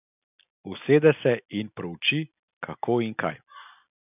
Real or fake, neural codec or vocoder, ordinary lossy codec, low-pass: real; none; none; 3.6 kHz